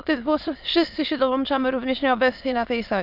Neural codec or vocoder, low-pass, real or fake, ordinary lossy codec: autoencoder, 22.05 kHz, a latent of 192 numbers a frame, VITS, trained on many speakers; 5.4 kHz; fake; AAC, 48 kbps